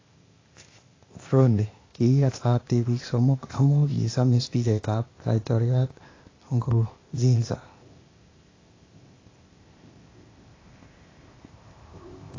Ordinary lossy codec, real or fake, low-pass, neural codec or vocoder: AAC, 32 kbps; fake; 7.2 kHz; codec, 16 kHz, 0.8 kbps, ZipCodec